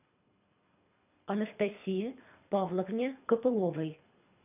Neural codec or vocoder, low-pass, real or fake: codec, 24 kHz, 3 kbps, HILCodec; 3.6 kHz; fake